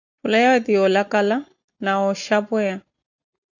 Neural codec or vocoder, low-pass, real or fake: none; 7.2 kHz; real